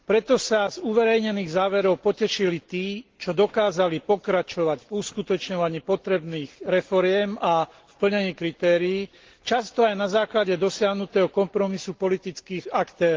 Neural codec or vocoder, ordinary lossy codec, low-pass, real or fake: none; Opus, 16 kbps; 7.2 kHz; real